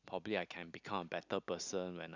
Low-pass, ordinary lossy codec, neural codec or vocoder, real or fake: 7.2 kHz; none; none; real